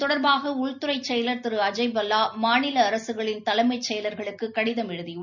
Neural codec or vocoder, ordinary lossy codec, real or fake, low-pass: none; none; real; 7.2 kHz